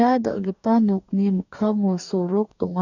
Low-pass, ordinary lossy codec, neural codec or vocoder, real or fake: 7.2 kHz; none; codec, 44.1 kHz, 2.6 kbps, DAC; fake